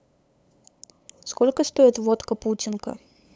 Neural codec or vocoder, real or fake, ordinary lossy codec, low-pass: codec, 16 kHz, 8 kbps, FunCodec, trained on LibriTTS, 25 frames a second; fake; none; none